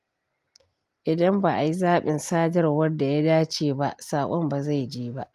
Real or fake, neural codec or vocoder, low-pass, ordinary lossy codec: real; none; 14.4 kHz; Opus, 32 kbps